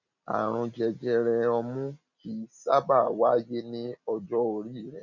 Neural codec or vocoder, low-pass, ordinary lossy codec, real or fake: none; 7.2 kHz; none; real